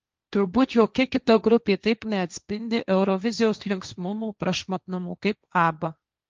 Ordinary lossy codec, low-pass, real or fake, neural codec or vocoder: Opus, 24 kbps; 7.2 kHz; fake; codec, 16 kHz, 1.1 kbps, Voila-Tokenizer